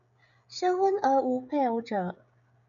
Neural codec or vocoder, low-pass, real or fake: codec, 16 kHz, 16 kbps, FreqCodec, smaller model; 7.2 kHz; fake